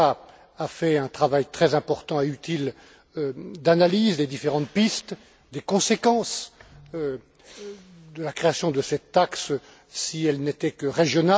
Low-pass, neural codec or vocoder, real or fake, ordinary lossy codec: none; none; real; none